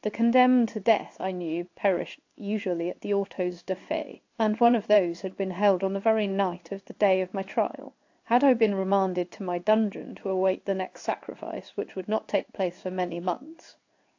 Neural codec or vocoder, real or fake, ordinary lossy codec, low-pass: codec, 16 kHz in and 24 kHz out, 1 kbps, XY-Tokenizer; fake; AAC, 48 kbps; 7.2 kHz